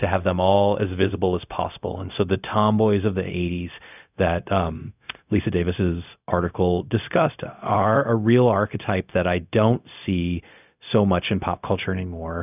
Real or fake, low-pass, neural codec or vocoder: fake; 3.6 kHz; codec, 16 kHz, 0.4 kbps, LongCat-Audio-Codec